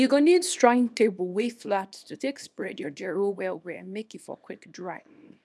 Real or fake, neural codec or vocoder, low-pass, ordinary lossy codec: fake; codec, 24 kHz, 0.9 kbps, WavTokenizer, small release; none; none